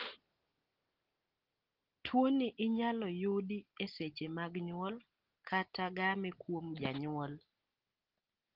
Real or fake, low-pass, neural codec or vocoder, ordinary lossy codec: real; 5.4 kHz; none; Opus, 16 kbps